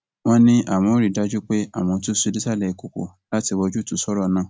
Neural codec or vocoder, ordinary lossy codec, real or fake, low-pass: none; none; real; none